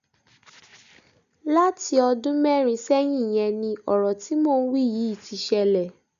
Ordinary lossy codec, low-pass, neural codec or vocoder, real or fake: none; 7.2 kHz; none; real